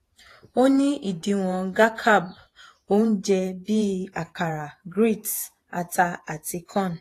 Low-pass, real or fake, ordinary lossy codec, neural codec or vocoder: 14.4 kHz; fake; AAC, 48 kbps; vocoder, 48 kHz, 128 mel bands, Vocos